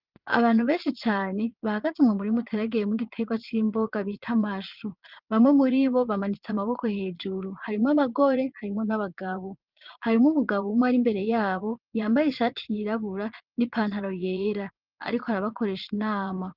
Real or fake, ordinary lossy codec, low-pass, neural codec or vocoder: fake; Opus, 32 kbps; 5.4 kHz; vocoder, 22.05 kHz, 80 mel bands, Vocos